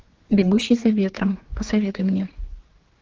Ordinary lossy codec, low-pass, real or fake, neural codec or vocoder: Opus, 16 kbps; 7.2 kHz; fake; codec, 16 kHz, 4 kbps, X-Codec, HuBERT features, trained on general audio